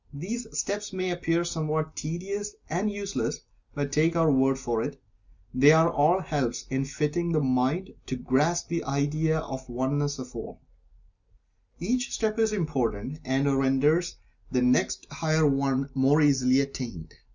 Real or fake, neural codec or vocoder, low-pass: real; none; 7.2 kHz